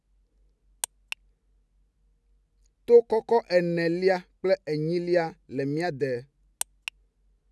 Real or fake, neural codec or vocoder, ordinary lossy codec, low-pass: real; none; none; none